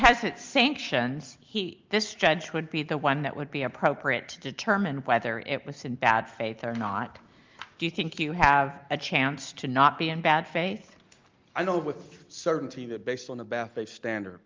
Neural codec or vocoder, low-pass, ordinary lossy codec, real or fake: none; 7.2 kHz; Opus, 24 kbps; real